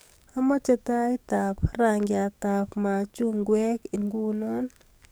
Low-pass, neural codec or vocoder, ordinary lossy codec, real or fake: none; codec, 44.1 kHz, 7.8 kbps, DAC; none; fake